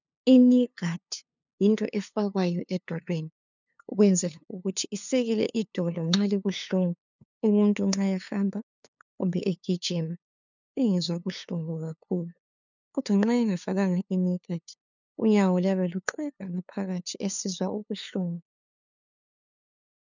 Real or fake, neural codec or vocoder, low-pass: fake; codec, 16 kHz, 2 kbps, FunCodec, trained on LibriTTS, 25 frames a second; 7.2 kHz